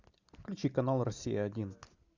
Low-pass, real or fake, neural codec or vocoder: 7.2 kHz; real; none